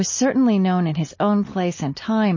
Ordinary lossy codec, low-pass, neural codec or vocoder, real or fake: MP3, 32 kbps; 7.2 kHz; none; real